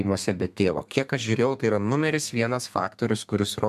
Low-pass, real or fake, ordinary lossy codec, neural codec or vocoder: 14.4 kHz; fake; MP3, 96 kbps; codec, 32 kHz, 1.9 kbps, SNAC